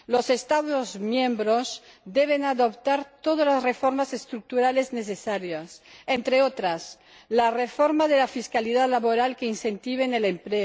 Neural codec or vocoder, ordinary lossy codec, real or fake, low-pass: none; none; real; none